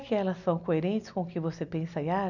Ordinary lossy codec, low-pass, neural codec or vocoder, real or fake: none; 7.2 kHz; none; real